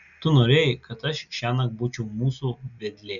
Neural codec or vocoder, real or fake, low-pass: none; real; 7.2 kHz